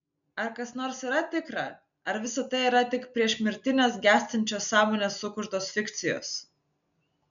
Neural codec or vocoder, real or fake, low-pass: none; real; 7.2 kHz